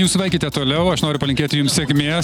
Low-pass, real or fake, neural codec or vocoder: 19.8 kHz; real; none